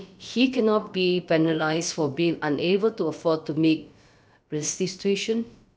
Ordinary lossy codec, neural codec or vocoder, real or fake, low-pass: none; codec, 16 kHz, about 1 kbps, DyCAST, with the encoder's durations; fake; none